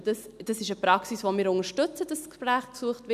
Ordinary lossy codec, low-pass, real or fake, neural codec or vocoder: none; 14.4 kHz; real; none